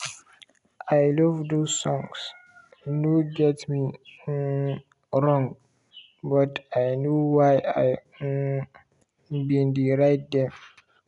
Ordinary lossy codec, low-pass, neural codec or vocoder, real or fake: MP3, 96 kbps; 10.8 kHz; none; real